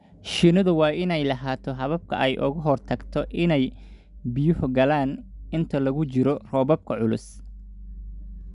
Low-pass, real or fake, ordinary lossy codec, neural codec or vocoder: 10.8 kHz; real; none; none